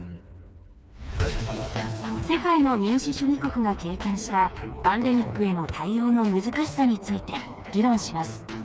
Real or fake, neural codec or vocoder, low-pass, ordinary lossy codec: fake; codec, 16 kHz, 2 kbps, FreqCodec, smaller model; none; none